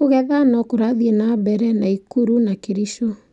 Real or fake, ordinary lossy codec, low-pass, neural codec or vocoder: real; none; 10.8 kHz; none